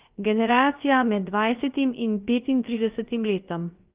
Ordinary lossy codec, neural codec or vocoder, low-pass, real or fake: Opus, 32 kbps; codec, 16 kHz, about 1 kbps, DyCAST, with the encoder's durations; 3.6 kHz; fake